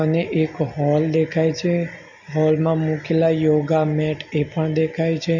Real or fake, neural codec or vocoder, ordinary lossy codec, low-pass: real; none; none; 7.2 kHz